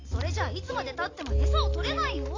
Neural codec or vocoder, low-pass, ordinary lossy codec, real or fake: none; 7.2 kHz; AAC, 32 kbps; real